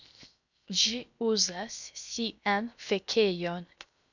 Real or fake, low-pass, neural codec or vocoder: fake; 7.2 kHz; codec, 16 kHz, 0.7 kbps, FocalCodec